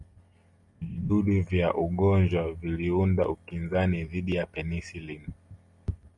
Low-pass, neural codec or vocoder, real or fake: 10.8 kHz; none; real